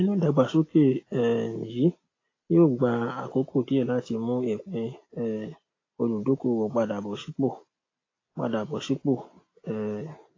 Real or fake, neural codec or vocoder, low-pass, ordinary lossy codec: real; none; 7.2 kHz; AAC, 32 kbps